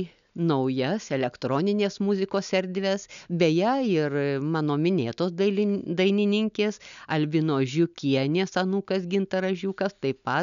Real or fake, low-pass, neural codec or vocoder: real; 7.2 kHz; none